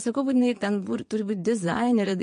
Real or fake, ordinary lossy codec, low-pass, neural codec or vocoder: fake; MP3, 48 kbps; 9.9 kHz; vocoder, 22.05 kHz, 80 mel bands, Vocos